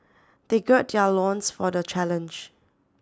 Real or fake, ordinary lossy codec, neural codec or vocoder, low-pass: real; none; none; none